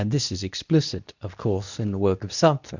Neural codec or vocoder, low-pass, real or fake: codec, 24 kHz, 0.9 kbps, WavTokenizer, medium speech release version 2; 7.2 kHz; fake